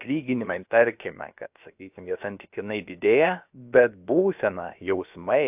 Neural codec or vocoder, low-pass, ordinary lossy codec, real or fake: codec, 16 kHz, about 1 kbps, DyCAST, with the encoder's durations; 3.6 kHz; AAC, 32 kbps; fake